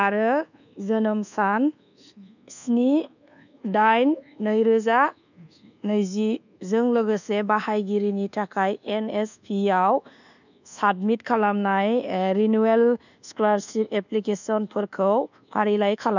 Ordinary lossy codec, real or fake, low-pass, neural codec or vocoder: none; fake; 7.2 kHz; codec, 24 kHz, 1.2 kbps, DualCodec